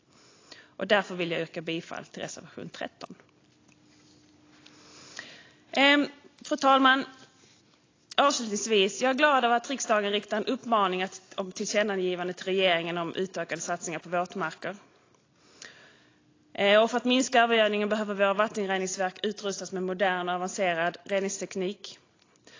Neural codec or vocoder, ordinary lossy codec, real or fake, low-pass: none; AAC, 32 kbps; real; 7.2 kHz